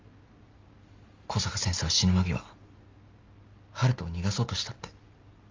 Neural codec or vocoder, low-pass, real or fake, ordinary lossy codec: none; 7.2 kHz; real; Opus, 32 kbps